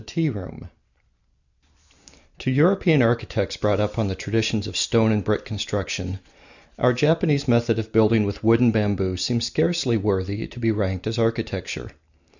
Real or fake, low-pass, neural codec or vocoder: real; 7.2 kHz; none